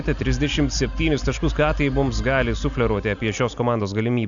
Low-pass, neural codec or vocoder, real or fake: 7.2 kHz; none; real